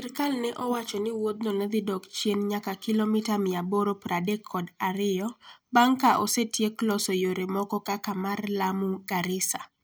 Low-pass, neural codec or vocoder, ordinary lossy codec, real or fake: none; none; none; real